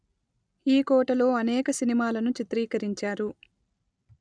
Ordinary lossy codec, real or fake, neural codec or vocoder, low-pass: none; real; none; 9.9 kHz